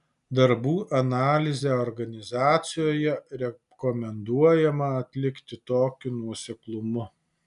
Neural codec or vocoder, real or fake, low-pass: none; real; 10.8 kHz